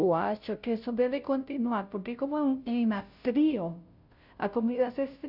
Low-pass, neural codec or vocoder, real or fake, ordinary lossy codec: 5.4 kHz; codec, 16 kHz, 0.5 kbps, FunCodec, trained on Chinese and English, 25 frames a second; fake; none